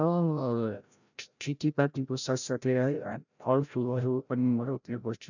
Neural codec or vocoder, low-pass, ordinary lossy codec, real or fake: codec, 16 kHz, 0.5 kbps, FreqCodec, larger model; 7.2 kHz; none; fake